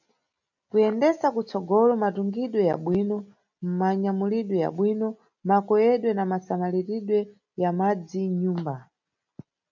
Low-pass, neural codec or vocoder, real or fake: 7.2 kHz; none; real